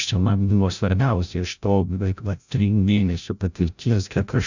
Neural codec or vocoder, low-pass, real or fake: codec, 16 kHz, 0.5 kbps, FreqCodec, larger model; 7.2 kHz; fake